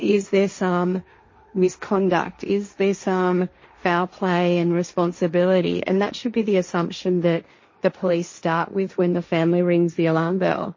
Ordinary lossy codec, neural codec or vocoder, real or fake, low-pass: MP3, 32 kbps; codec, 16 kHz, 1.1 kbps, Voila-Tokenizer; fake; 7.2 kHz